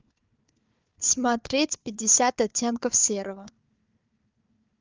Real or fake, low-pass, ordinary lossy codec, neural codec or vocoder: fake; 7.2 kHz; Opus, 32 kbps; codec, 16 kHz, 4 kbps, FunCodec, trained on Chinese and English, 50 frames a second